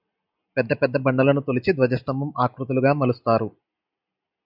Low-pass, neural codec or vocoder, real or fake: 5.4 kHz; none; real